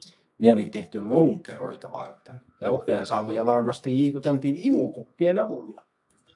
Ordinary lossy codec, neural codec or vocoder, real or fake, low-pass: AAC, 64 kbps; codec, 24 kHz, 0.9 kbps, WavTokenizer, medium music audio release; fake; 10.8 kHz